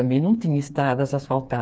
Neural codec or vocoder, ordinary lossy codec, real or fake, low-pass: codec, 16 kHz, 4 kbps, FreqCodec, smaller model; none; fake; none